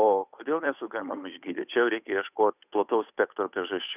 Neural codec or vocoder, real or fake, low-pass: codec, 16 kHz, 8 kbps, FunCodec, trained on Chinese and English, 25 frames a second; fake; 3.6 kHz